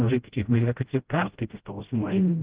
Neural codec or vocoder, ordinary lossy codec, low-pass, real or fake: codec, 16 kHz, 0.5 kbps, FreqCodec, smaller model; Opus, 16 kbps; 3.6 kHz; fake